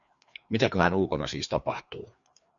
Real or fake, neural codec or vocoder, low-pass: fake; codec, 16 kHz, 2 kbps, FreqCodec, larger model; 7.2 kHz